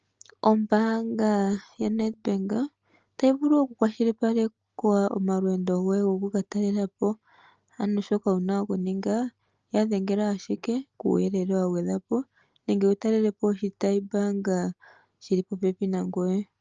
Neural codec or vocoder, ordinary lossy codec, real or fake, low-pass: none; Opus, 24 kbps; real; 7.2 kHz